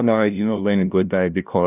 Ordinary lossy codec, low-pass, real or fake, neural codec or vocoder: none; 3.6 kHz; fake; codec, 16 kHz, 0.5 kbps, FunCodec, trained on LibriTTS, 25 frames a second